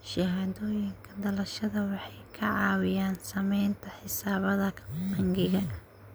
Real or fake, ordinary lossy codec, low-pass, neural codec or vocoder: real; none; none; none